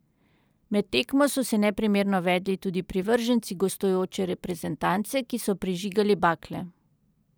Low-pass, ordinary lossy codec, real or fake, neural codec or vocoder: none; none; fake; vocoder, 44.1 kHz, 128 mel bands every 512 samples, BigVGAN v2